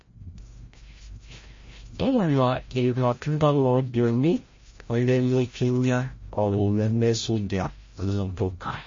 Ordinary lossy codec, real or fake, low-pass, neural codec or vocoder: MP3, 32 kbps; fake; 7.2 kHz; codec, 16 kHz, 0.5 kbps, FreqCodec, larger model